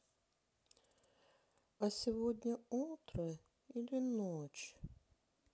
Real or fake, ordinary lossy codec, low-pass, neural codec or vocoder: real; none; none; none